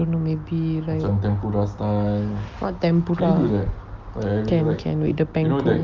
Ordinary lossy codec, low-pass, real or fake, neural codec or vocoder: Opus, 24 kbps; 7.2 kHz; real; none